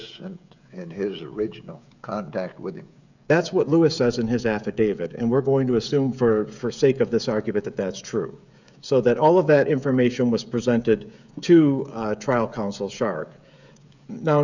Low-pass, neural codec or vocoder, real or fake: 7.2 kHz; codec, 16 kHz, 16 kbps, FreqCodec, smaller model; fake